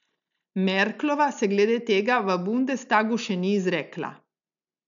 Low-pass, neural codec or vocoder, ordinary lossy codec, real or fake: 7.2 kHz; none; none; real